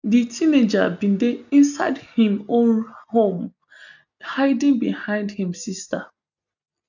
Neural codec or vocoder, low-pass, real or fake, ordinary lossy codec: none; 7.2 kHz; real; none